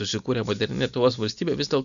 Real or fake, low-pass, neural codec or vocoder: real; 7.2 kHz; none